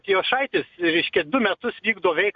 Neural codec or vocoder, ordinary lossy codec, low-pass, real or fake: none; AAC, 64 kbps; 7.2 kHz; real